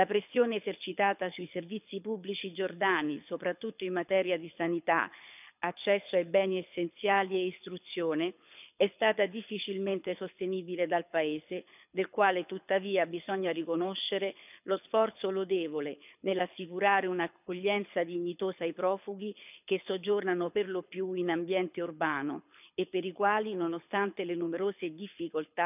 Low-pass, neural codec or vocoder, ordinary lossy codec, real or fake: 3.6 kHz; codec, 24 kHz, 6 kbps, HILCodec; none; fake